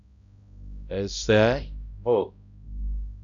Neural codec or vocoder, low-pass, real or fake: codec, 16 kHz, 0.5 kbps, X-Codec, HuBERT features, trained on balanced general audio; 7.2 kHz; fake